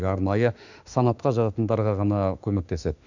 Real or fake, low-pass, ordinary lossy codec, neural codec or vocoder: fake; 7.2 kHz; none; autoencoder, 48 kHz, 32 numbers a frame, DAC-VAE, trained on Japanese speech